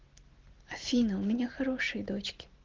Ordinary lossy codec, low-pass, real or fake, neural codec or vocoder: Opus, 16 kbps; 7.2 kHz; real; none